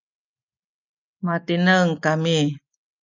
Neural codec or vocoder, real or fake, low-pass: none; real; 7.2 kHz